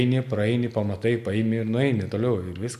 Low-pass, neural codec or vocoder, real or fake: 14.4 kHz; none; real